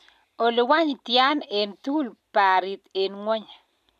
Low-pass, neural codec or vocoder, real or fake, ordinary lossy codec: 14.4 kHz; none; real; none